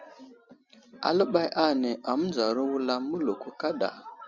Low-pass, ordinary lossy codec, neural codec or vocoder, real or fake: 7.2 kHz; Opus, 64 kbps; none; real